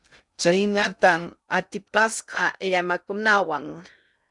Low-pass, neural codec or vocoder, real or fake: 10.8 kHz; codec, 16 kHz in and 24 kHz out, 0.6 kbps, FocalCodec, streaming, 2048 codes; fake